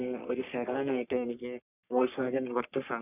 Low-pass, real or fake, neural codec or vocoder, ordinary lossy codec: 3.6 kHz; fake; codec, 44.1 kHz, 3.4 kbps, Pupu-Codec; none